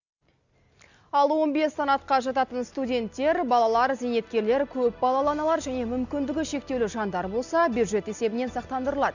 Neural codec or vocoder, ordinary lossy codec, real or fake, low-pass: none; none; real; 7.2 kHz